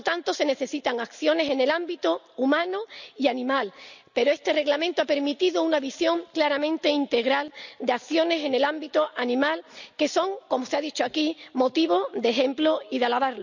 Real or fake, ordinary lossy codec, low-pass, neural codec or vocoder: real; none; 7.2 kHz; none